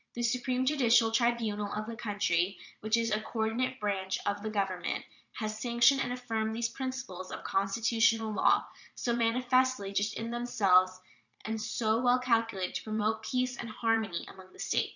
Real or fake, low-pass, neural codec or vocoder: fake; 7.2 kHz; vocoder, 22.05 kHz, 80 mel bands, Vocos